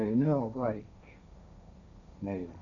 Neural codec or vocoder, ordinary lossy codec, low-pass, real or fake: codec, 16 kHz, 1.1 kbps, Voila-Tokenizer; none; 7.2 kHz; fake